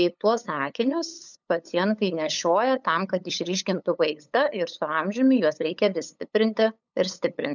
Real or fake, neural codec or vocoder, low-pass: fake; codec, 16 kHz, 8 kbps, FunCodec, trained on LibriTTS, 25 frames a second; 7.2 kHz